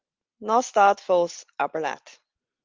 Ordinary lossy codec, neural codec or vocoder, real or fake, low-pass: Opus, 32 kbps; none; real; 7.2 kHz